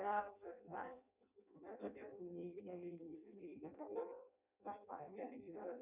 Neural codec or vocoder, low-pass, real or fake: codec, 16 kHz in and 24 kHz out, 0.6 kbps, FireRedTTS-2 codec; 3.6 kHz; fake